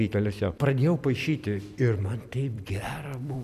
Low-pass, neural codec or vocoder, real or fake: 14.4 kHz; vocoder, 44.1 kHz, 128 mel bands every 256 samples, BigVGAN v2; fake